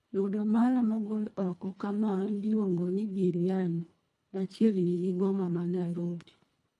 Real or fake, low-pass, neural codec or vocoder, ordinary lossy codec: fake; none; codec, 24 kHz, 1.5 kbps, HILCodec; none